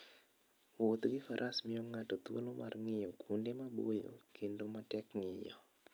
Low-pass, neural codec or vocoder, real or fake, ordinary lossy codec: none; none; real; none